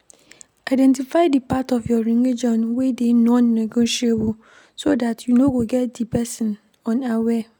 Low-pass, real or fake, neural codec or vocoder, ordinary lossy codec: none; real; none; none